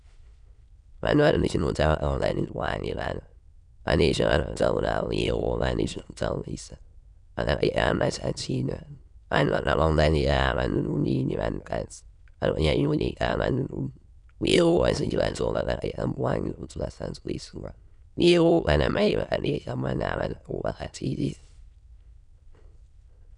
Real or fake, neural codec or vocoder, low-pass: fake; autoencoder, 22.05 kHz, a latent of 192 numbers a frame, VITS, trained on many speakers; 9.9 kHz